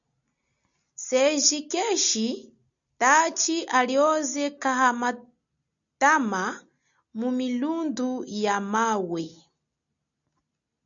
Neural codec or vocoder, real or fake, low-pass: none; real; 7.2 kHz